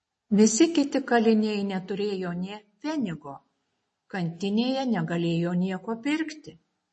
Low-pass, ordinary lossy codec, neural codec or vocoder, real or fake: 10.8 kHz; MP3, 32 kbps; none; real